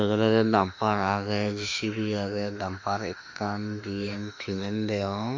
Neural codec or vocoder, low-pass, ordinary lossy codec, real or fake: autoencoder, 48 kHz, 32 numbers a frame, DAC-VAE, trained on Japanese speech; 7.2 kHz; MP3, 64 kbps; fake